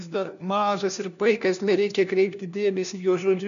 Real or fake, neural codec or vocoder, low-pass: fake; codec, 16 kHz, 1 kbps, FunCodec, trained on LibriTTS, 50 frames a second; 7.2 kHz